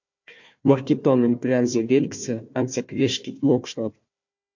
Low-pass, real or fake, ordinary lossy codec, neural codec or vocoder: 7.2 kHz; fake; MP3, 48 kbps; codec, 16 kHz, 1 kbps, FunCodec, trained on Chinese and English, 50 frames a second